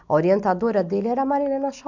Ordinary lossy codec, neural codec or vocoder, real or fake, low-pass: none; none; real; 7.2 kHz